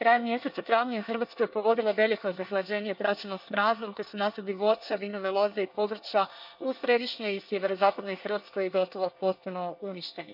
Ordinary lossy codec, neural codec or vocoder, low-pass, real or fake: none; codec, 24 kHz, 1 kbps, SNAC; 5.4 kHz; fake